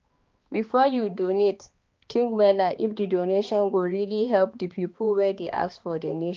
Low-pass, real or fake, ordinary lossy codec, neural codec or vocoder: 7.2 kHz; fake; Opus, 24 kbps; codec, 16 kHz, 2 kbps, X-Codec, HuBERT features, trained on balanced general audio